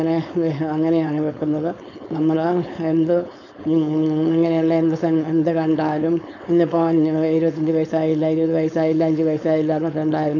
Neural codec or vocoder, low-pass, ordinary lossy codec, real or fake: codec, 16 kHz, 4.8 kbps, FACodec; 7.2 kHz; none; fake